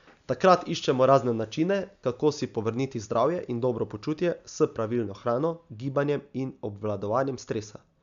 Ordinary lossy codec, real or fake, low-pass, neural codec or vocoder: none; real; 7.2 kHz; none